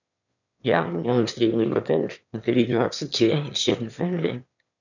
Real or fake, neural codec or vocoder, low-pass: fake; autoencoder, 22.05 kHz, a latent of 192 numbers a frame, VITS, trained on one speaker; 7.2 kHz